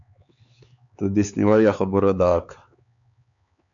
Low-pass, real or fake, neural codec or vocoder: 7.2 kHz; fake; codec, 16 kHz, 4 kbps, X-Codec, HuBERT features, trained on LibriSpeech